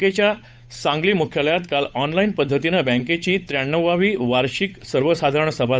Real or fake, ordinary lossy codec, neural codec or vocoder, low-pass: fake; none; codec, 16 kHz, 8 kbps, FunCodec, trained on Chinese and English, 25 frames a second; none